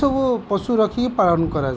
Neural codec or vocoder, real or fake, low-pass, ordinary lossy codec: none; real; none; none